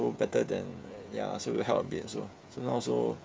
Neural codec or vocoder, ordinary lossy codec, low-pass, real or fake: codec, 16 kHz, 16 kbps, FreqCodec, smaller model; none; none; fake